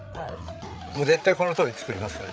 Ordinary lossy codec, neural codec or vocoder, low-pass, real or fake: none; codec, 16 kHz, 8 kbps, FreqCodec, larger model; none; fake